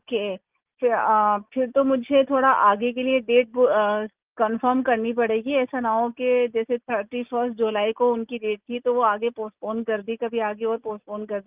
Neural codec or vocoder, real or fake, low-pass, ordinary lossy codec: none; real; 3.6 kHz; Opus, 24 kbps